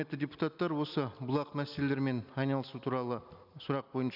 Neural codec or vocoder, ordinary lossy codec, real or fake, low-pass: none; none; real; 5.4 kHz